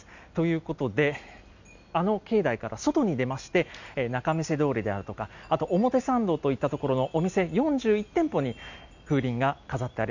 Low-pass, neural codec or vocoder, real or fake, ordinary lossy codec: 7.2 kHz; none; real; none